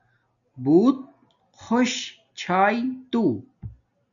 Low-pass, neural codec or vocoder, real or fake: 7.2 kHz; none; real